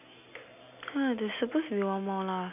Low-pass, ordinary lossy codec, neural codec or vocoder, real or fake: 3.6 kHz; none; none; real